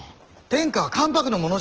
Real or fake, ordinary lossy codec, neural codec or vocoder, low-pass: real; Opus, 16 kbps; none; 7.2 kHz